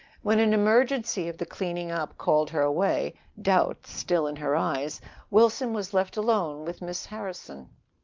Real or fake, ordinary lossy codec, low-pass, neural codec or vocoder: real; Opus, 24 kbps; 7.2 kHz; none